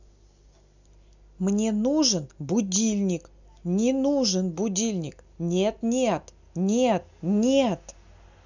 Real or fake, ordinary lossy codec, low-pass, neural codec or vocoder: real; none; 7.2 kHz; none